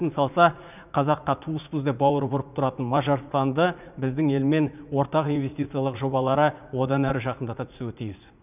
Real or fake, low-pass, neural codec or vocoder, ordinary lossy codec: fake; 3.6 kHz; vocoder, 44.1 kHz, 80 mel bands, Vocos; none